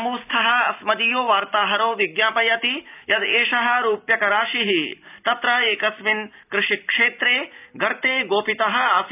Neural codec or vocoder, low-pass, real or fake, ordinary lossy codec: none; 3.6 kHz; real; none